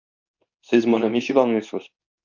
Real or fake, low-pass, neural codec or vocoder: fake; 7.2 kHz; codec, 24 kHz, 0.9 kbps, WavTokenizer, medium speech release version 2